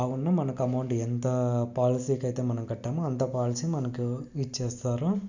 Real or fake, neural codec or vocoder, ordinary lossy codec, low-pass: real; none; none; 7.2 kHz